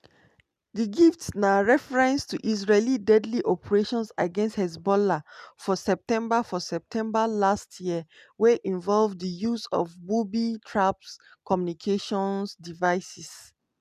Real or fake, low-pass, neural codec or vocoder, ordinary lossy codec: real; 14.4 kHz; none; none